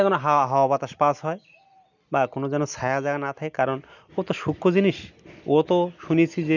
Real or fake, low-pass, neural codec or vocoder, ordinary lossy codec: real; 7.2 kHz; none; none